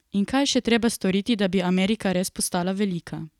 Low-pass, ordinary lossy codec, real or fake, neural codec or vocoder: 19.8 kHz; none; real; none